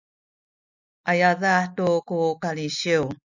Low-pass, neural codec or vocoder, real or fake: 7.2 kHz; none; real